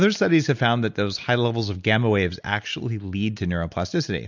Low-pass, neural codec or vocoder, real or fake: 7.2 kHz; none; real